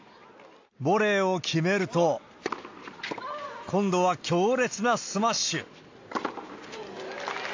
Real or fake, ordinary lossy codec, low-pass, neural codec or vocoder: real; AAC, 48 kbps; 7.2 kHz; none